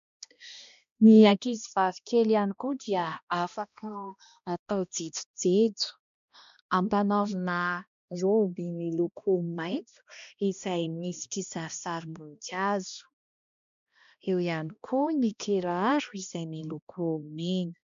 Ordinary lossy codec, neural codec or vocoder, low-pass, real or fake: MP3, 64 kbps; codec, 16 kHz, 1 kbps, X-Codec, HuBERT features, trained on balanced general audio; 7.2 kHz; fake